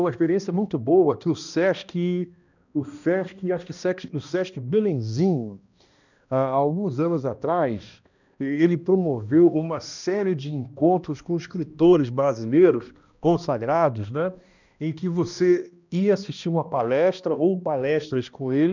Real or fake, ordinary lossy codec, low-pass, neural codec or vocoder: fake; none; 7.2 kHz; codec, 16 kHz, 1 kbps, X-Codec, HuBERT features, trained on balanced general audio